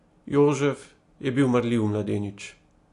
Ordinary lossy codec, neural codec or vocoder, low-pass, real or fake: AAC, 48 kbps; none; 10.8 kHz; real